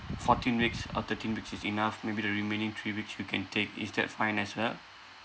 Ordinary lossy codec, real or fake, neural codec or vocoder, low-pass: none; real; none; none